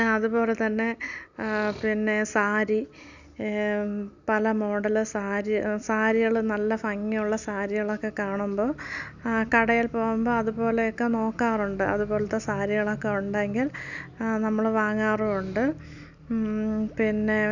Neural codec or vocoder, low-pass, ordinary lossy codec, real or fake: none; 7.2 kHz; none; real